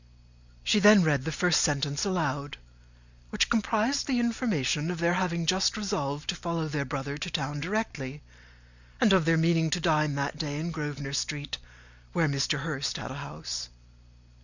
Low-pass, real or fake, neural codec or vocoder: 7.2 kHz; real; none